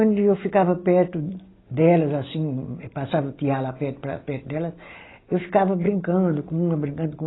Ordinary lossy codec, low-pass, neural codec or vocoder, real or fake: AAC, 16 kbps; 7.2 kHz; none; real